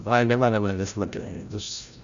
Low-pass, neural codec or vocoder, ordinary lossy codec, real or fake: 7.2 kHz; codec, 16 kHz, 0.5 kbps, FreqCodec, larger model; Opus, 64 kbps; fake